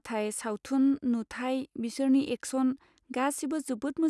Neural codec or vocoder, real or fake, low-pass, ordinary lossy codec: none; real; none; none